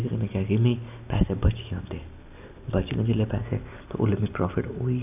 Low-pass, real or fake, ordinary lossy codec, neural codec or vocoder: 3.6 kHz; real; AAC, 32 kbps; none